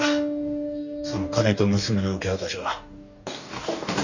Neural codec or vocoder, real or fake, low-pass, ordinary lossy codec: codec, 44.1 kHz, 2.6 kbps, DAC; fake; 7.2 kHz; none